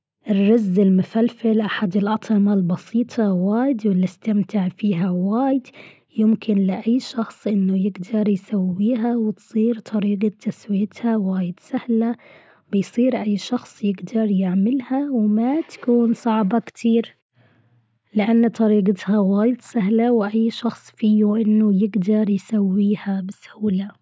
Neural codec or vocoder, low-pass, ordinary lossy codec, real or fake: none; none; none; real